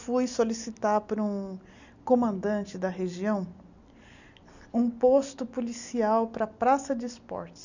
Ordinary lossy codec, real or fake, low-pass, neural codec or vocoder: none; real; 7.2 kHz; none